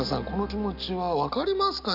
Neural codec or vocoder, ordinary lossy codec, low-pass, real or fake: none; none; 5.4 kHz; real